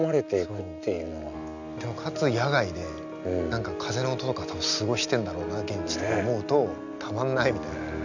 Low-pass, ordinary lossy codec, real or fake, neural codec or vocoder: 7.2 kHz; none; real; none